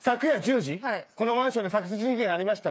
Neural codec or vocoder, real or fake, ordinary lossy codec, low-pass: codec, 16 kHz, 4 kbps, FreqCodec, smaller model; fake; none; none